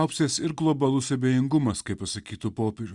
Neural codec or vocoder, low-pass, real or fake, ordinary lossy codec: none; 10.8 kHz; real; Opus, 64 kbps